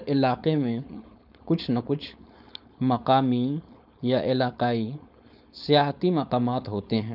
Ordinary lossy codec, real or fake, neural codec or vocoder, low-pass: none; fake; codec, 16 kHz, 4.8 kbps, FACodec; 5.4 kHz